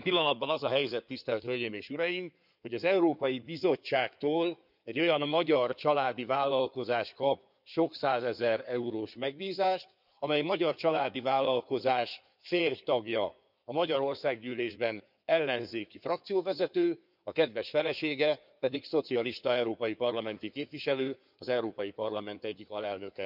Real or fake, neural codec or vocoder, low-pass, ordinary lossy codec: fake; codec, 16 kHz in and 24 kHz out, 2.2 kbps, FireRedTTS-2 codec; 5.4 kHz; none